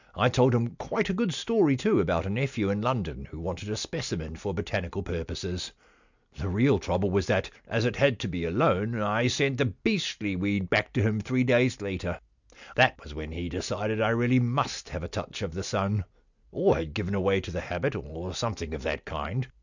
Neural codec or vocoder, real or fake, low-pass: none; real; 7.2 kHz